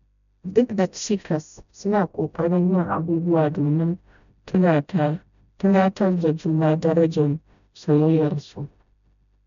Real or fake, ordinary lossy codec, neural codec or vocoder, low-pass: fake; none; codec, 16 kHz, 0.5 kbps, FreqCodec, smaller model; 7.2 kHz